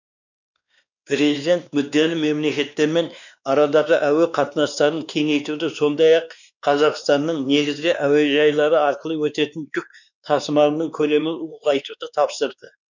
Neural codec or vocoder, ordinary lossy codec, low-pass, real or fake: codec, 16 kHz, 2 kbps, X-Codec, WavLM features, trained on Multilingual LibriSpeech; none; 7.2 kHz; fake